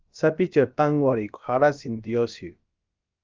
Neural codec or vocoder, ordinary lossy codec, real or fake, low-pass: codec, 16 kHz, about 1 kbps, DyCAST, with the encoder's durations; Opus, 24 kbps; fake; 7.2 kHz